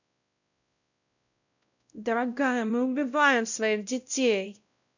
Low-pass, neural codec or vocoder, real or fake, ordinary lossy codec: 7.2 kHz; codec, 16 kHz, 0.5 kbps, X-Codec, WavLM features, trained on Multilingual LibriSpeech; fake; none